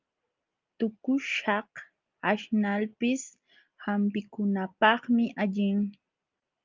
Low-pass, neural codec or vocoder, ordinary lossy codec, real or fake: 7.2 kHz; none; Opus, 24 kbps; real